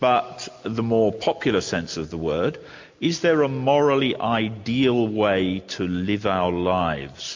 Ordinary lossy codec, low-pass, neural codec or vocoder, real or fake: MP3, 48 kbps; 7.2 kHz; none; real